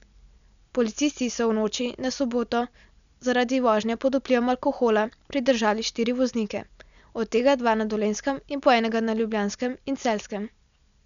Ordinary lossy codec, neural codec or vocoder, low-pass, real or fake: none; none; 7.2 kHz; real